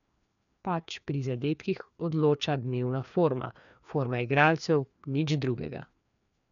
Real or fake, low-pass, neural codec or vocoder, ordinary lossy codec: fake; 7.2 kHz; codec, 16 kHz, 2 kbps, FreqCodec, larger model; MP3, 96 kbps